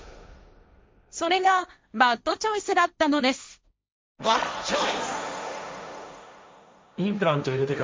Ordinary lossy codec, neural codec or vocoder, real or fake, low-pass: none; codec, 16 kHz, 1.1 kbps, Voila-Tokenizer; fake; none